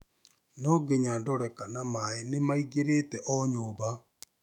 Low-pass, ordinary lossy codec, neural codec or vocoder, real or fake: 19.8 kHz; none; autoencoder, 48 kHz, 128 numbers a frame, DAC-VAE, trained on Japanese speech; fake